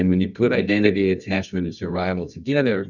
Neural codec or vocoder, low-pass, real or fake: codec, 24 kHz, 0.9 kbps, WavTokenizer, medium music audio release; 7.2 kHz; fake